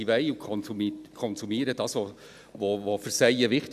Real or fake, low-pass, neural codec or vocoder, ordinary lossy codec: real; 14.4 kHz; none; none